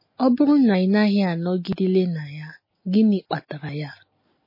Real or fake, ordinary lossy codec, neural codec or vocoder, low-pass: real; MP3, 24 kbps; none; 5.4 kHz